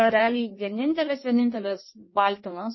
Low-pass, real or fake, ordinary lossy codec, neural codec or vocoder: 7.2 kHz; fake; MP3, 24 kbps; codec, 16 kHz in and 24 kHz out, 1.1 kbps, FireRedTTS-2 codec